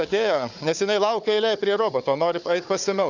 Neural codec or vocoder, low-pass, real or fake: codec, 16 kHz, 4 kbps, FunCodec, trained on Chinese and English, 50 frames a second; 7.2 kHz; fake